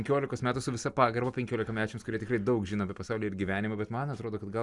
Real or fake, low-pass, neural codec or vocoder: real; 10.8 kHz; none